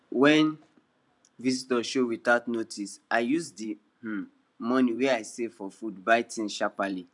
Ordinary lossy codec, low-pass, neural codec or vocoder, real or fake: none; 10.8 kHz; vocoder, 44.1 kHz, 128 mel bands every 512 samples, BigVGAN v2; fake